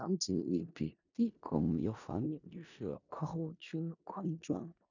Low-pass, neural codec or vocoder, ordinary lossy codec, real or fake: 7.2 kHz; codec, 16 kHz in and 24 kHz out, 0.4 kbps, LongCat-Audio-Codec, four codebook decoder; none; fake